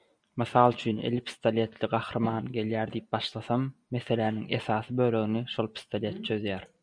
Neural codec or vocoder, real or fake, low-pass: none; real; 9.9 kHz